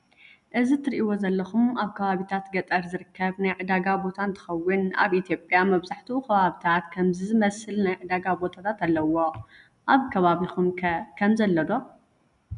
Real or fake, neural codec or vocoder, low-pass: real; none; 10.8 kHz